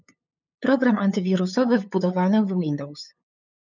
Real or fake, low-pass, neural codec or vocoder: fake; 7.2 kHz; codec, 16 kHz, 8 kbps, FunCodec, trained on LibriTTS, 25 frames a second